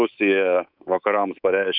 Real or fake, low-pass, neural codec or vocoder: fake; 5.4 kHz; codec, 24 kHz, 3.1 kbps, DualCodec